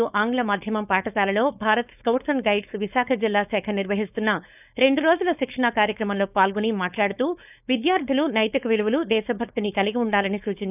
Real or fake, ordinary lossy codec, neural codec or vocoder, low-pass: fake; none; codec, 16 kHz, 4.8 kbps, FACodec; 3.6 kHz